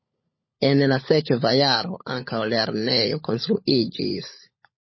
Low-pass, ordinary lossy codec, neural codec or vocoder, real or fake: 7.2 kHz; MP3, 24 kbps; codec, 16 kHz, 16 kbps, FunCodec, trained on LibriTTS, 50 frames a second; fake